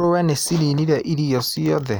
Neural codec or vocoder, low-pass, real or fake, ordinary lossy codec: none; none; real; none